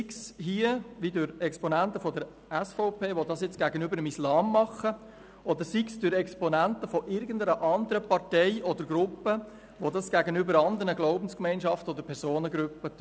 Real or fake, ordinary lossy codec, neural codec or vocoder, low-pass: real; none; none; none